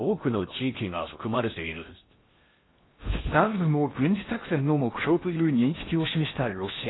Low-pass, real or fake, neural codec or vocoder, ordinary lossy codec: 7.2 kHz; fake; codec, 16 kHz in and 24 kHz out, 0.6 kbps, FocalCodec, streaming, 4096 codes; AAC, 16 kbps